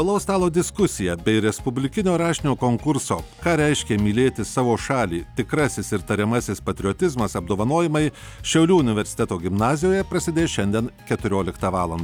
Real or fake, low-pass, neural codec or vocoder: real; 19.8 kHz; none